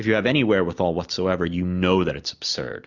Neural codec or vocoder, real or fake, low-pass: none; real; 7.2 kHz